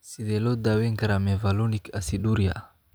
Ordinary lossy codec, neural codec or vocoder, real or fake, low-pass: none; none; real; none